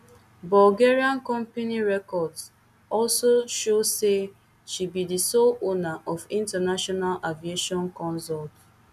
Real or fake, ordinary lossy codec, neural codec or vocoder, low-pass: real; none; none; 14.4 kHz